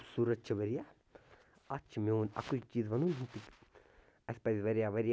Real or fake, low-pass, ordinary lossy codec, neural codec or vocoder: real; none; none; none